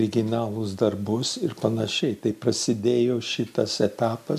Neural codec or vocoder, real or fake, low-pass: none; real; 14.4 kHz